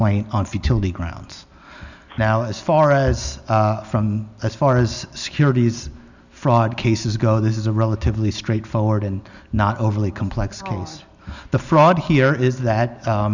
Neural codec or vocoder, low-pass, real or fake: none; 7.2 kHz; real